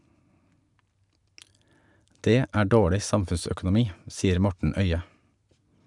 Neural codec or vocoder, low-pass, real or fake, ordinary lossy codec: none; 10.8 kHz; real; none